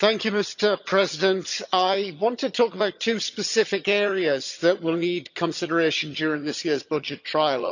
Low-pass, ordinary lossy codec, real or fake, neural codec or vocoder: 7.2 kHz; none; fake; vocoder, 22.05 kHz, 80 mel bands, HiFi-GAN